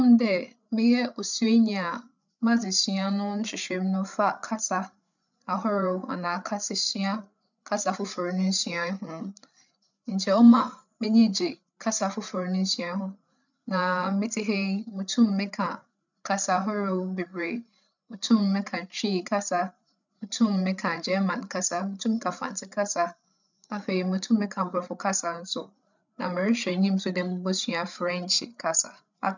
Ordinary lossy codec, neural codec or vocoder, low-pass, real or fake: none; codec, 16 kHz, 8 kbps, FreqCodec, larger model; 7.2 kHz; fake